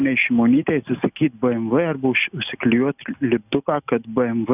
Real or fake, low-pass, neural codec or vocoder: real; 3.6 kHz; none